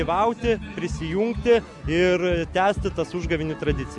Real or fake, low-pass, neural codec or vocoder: real; 10.8 kHz; none